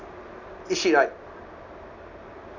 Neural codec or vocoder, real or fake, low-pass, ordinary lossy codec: none; real; 7.2 kHz; none